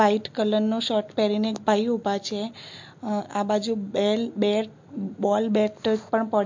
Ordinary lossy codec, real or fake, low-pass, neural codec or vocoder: MP3, 48 kbps; real; 7.2 kHz; none